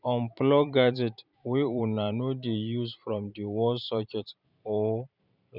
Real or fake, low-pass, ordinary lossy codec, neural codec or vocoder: fake; 5.4 kHz; none; vocoder, 44.1 kHz, 128 mel bands every 512 samples, BigVGAN v2